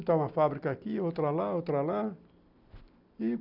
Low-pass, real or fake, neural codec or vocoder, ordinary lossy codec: 5.4 kHz; real; none; none